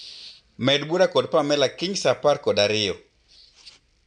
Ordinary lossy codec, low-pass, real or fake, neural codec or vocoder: none; 9.9 kHz; real; none